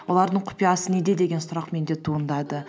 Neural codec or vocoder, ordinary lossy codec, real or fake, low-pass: none; none; real; none